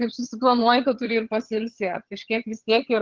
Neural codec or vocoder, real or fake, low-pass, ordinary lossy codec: vocoder, 22.05 kHz, 80 mel bands, HiFi-GAN; fake; 7.2 kHz; Opus, 16 kbps